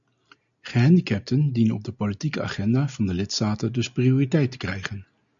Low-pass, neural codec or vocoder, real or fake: 7.2 kHz; none; real